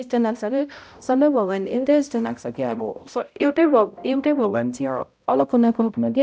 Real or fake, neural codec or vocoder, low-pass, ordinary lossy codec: fake; codec, 16 kHz, 0.5 kbps, X-Codec, HuBERT features, trained on balanced general audio; none; none